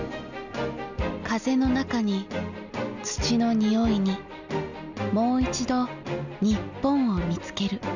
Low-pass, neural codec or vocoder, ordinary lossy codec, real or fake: 7.2 kHz; none; none; real